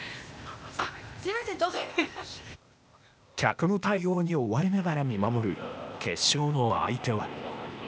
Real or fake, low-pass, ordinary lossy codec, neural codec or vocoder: fake; none; none; codec, 16 kHz, 0.8 kbps, ZipCodec